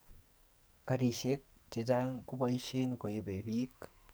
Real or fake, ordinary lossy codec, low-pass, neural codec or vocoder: fake; none; none; codec, 44.1 kHz, 2.6 kbps, SNAC